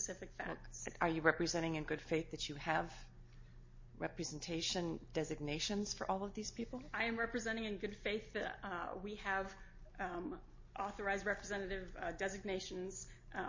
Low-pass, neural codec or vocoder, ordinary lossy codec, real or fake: 7.2 kHz; none; MP3, 32 kbps; real